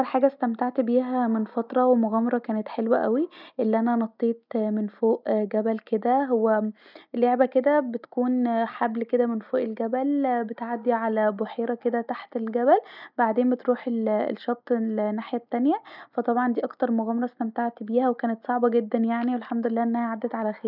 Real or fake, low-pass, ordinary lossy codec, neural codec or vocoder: real; 5.4 kHz; none; none